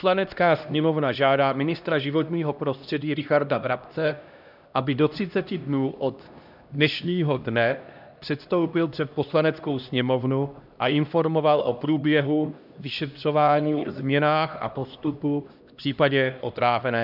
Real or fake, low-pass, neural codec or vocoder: fake; 5.4 kHz; codec, 16 kHz, 1 kbps, X-Codec, HuBERT features, trained on LibriSpeech